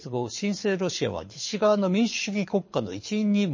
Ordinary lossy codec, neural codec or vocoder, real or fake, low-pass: MP3, 32 kbps; codec, 24 kHz, 6 kbps, HILCodec; fake; 7.2 kHz